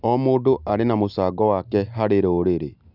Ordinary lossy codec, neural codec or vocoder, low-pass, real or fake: none; none; 5.4 kHz; real